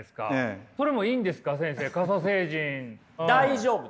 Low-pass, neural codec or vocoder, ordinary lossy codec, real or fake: none; none; none; real